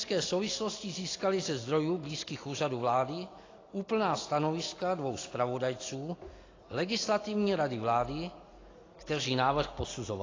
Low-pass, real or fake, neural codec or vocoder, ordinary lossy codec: 7.2 kHz; real; none; AAC, 32 kbps